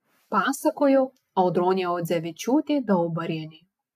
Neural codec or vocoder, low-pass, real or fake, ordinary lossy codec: none; 14.4 kHz; real; AAC, 96 kbps